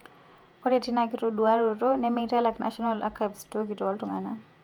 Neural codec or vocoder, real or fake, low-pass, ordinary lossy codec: vocoder, 48 kHz, 128 mel bands, Vocos; fake; 19.8 kHz; MP3, 96 kbps